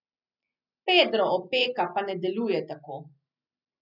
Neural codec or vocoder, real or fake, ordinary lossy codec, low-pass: none; real; none; 5.4 kHz